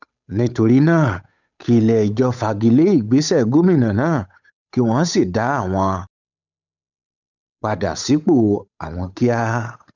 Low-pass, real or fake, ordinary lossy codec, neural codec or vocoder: 7.2 kHz; fake; none; codec, 16 kHz, 8 kbps, FunCodec, trained on Chinese and English, 25 frames a second